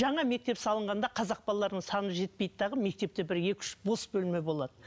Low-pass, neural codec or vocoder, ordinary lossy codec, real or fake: none; none; none; real